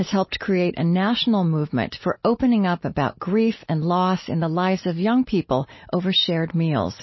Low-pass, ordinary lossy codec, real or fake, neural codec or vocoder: 7.2 kHz; MP3, 24 kbps; real; none